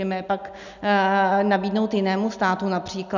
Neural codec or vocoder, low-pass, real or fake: none; 7.2 kHz; real